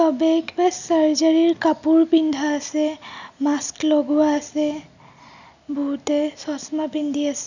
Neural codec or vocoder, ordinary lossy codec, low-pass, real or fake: none; none; 7.2 kHz; real